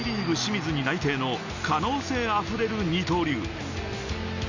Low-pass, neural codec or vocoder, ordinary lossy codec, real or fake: 7.2 kHz; none; none; real